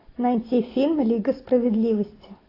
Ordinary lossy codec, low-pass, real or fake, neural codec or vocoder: AAC, 24 kbps; 5.4 kHz; real; none